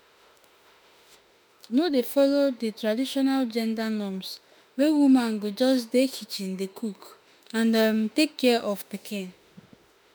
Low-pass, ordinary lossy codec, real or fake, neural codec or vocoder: none; none; fake; autoencoder, 48 kHz, 32 numbers a frame, DAC-VAE, trained on Japanese speech